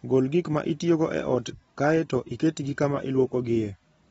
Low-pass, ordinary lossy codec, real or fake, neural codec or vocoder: 19.8 kHz; AAC, 24 kbps; real; none